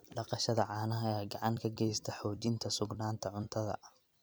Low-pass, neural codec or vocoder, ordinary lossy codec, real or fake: none; vocoder, 44.1 kHz, 128 mel bands every 256 samples, BigVGAN v2; none; fake